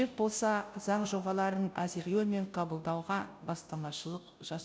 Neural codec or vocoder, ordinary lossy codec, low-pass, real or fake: codec, 16 kHz, 0.5 kbps, FunCodec, trained on Chinese and English, 25 frames a second; none; none; fake